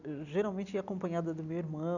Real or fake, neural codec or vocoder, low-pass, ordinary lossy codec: real; none; 7.2 kHz; none